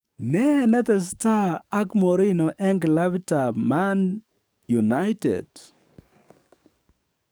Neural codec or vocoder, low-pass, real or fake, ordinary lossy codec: codec, 44.1 kHz, 7.8 kbps, DAC; none; fake; none